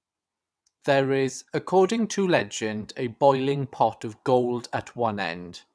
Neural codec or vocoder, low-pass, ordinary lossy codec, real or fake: vocoder, 22.05 kHz, 80 mel bands, WaveNeXt; none; none; fake